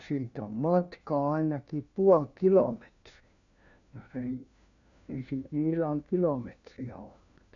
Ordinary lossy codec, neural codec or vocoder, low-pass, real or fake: none; codec, 16 kHz, 1 kbps, FunCodec, trained on LibriTTS, 50 frames a second; 7.2 kHz; fake